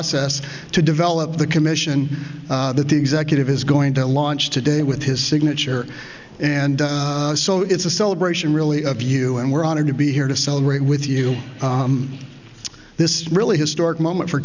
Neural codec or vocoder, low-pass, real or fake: none; 7.2 kHz; real